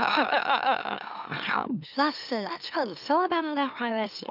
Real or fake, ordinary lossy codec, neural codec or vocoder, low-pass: fake; none; autoencoder, 44.1 kHz, a latent of 192 numbers a frame, MeloTTS; 5.4 kHz